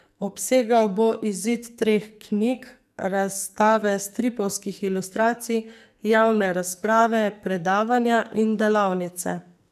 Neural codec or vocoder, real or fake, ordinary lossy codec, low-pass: codec, 44.1 kHz, 2.6 kbps, SNAC; fake; none; 14.4 kHz